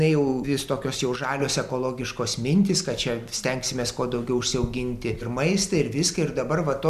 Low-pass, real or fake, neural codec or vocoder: 14.4 kHz; real; none